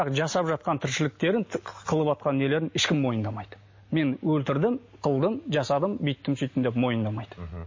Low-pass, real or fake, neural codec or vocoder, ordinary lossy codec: 7.2 kHz; real; none; MP3, 32 kbps